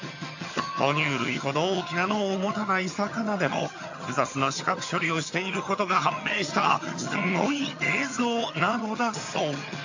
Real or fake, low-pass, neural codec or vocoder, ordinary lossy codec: fake; 7.2 kHz; vocoder, 22.05 kHz, 80 mel bands, HiFi-GAN; AAC, 48 kbps